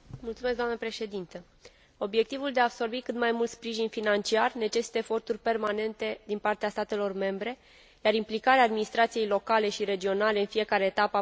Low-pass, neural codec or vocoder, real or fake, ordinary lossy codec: none; none; real; none